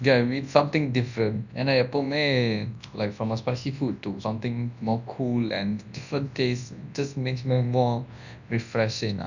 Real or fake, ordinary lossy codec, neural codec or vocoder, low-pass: fake; none; codec, 24 kHz, 0.9 kbps, WavTokenizer, large speech release; 7.2 kHz